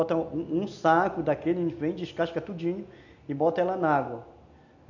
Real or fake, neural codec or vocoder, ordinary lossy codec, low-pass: real; none; none; 7.2 kHz